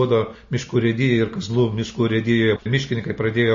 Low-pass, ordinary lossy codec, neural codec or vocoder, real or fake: 7.2 kHz; MP3, 32 kbps; none; real